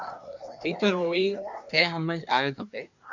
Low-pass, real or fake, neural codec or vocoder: 7.2 kHz; fake; codec, 24 kHz, 1 kbps, SNAC